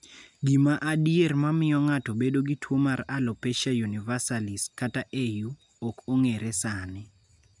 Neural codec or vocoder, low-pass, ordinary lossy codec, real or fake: none; 10.8 kHz; none; real